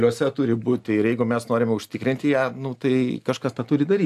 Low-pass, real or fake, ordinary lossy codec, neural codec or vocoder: 14.4 kHz; real; AAC, 96 kbps; none